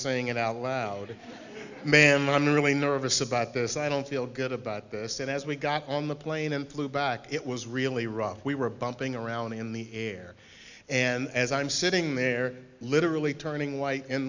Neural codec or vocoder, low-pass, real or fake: none; 7.2 kHz; real